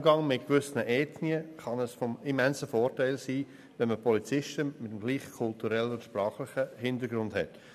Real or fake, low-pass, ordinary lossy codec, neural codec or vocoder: real; 14.4 kHz; none; none